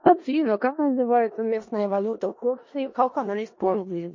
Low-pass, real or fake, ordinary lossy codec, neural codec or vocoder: 7.2 kHz; fake; MP3, 32 kbps; codec, 16 kHz in and 24 kHz out, 0.4 kbps, LongCat-Audio-Codec, four codebook decoder